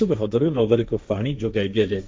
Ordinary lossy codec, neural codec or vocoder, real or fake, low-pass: none; codec, 16 kHz, 1.1 kbps, Voila-Tokenizer; fake; none